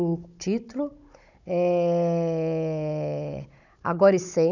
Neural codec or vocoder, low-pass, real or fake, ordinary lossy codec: codec, 16 kHz, 16 kbps, FunCodec, trained on Chinese and English, 50 frames a second; 7.2 kHz; fake; none